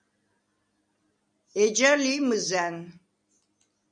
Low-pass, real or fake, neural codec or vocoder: 9.9 kHz; real; none